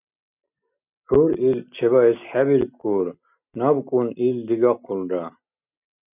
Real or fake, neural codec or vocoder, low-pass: real; none; 3.6 kHz